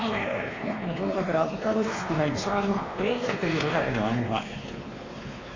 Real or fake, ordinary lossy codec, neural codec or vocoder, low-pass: fake; AAC, 32 kbps; codec, 16 kHz, 2 kbps, X-Codec, WavLM features, trained on Multilingual LibriSpeech; 7.2 kHz